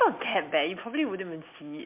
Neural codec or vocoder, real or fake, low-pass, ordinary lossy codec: none; real; 3.6 kHz; MP3, 32 kbps